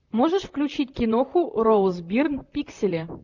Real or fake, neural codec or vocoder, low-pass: fake; vocoder, 44.1 kHz, 128 mel bands, Pupu-Vocoder; 7.2 kHz